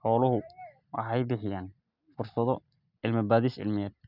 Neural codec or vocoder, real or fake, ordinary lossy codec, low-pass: none; real; none; 5.4 kHz